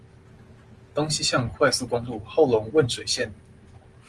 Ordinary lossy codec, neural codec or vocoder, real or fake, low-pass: Opus, 24 kbps; none; real; 10.8 kHz